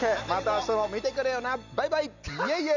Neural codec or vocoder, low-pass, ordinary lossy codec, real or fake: none; 7.2 kHz; none; real